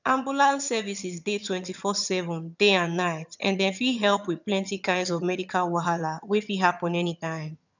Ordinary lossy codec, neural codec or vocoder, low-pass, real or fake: none; vocoder, 22.05 kHz, 80 mel bands, HiFi-GAN; 7.2 kHz; fake